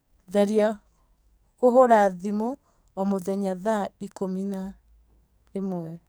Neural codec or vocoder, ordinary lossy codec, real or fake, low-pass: codec, 44.1 kHz, 2.6 kbps, SNAC; none; fake; none